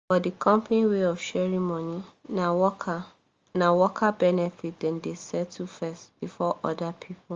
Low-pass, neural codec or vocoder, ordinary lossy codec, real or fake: 7.2 kHz; none; Opus, 32 kbps; real